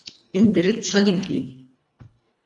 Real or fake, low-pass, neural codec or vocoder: fake; 10.8 kHz; codec, 24 kHz, 1.5 kbps, HILCodec